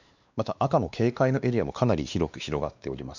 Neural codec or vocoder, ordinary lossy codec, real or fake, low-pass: codec, 16 kHz, 2 kbps, X-Codec, WavLM features, trained on Multilingual LibriSpeech; none; fake; 7.2 kHz